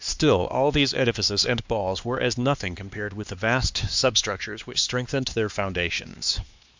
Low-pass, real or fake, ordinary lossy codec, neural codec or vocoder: 7.2 kHz; fake; MP3, 64 kbps; codec, 16 kHz, 2 kbps, X-Codec, HuBERT features, trained on LibriSpeech